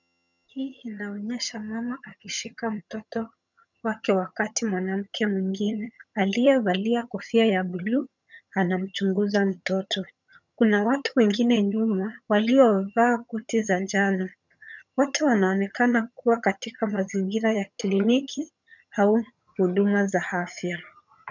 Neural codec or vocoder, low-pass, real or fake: vocoder, 22.05 kHz, 80 mel bands, HiFi-GAN; 7.2 kHz; fake